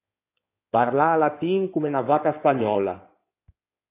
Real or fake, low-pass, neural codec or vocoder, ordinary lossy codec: fake; 3.6 kHz; codec, 16 kHz in and 24 kHz out, 2.2 kbps, FireRedTTS-2 codec; AAC, 24 kbps